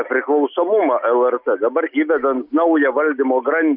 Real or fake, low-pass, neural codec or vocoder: real; 5.4 kHz; none